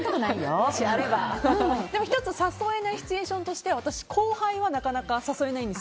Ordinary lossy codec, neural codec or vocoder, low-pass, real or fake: none; none; none; real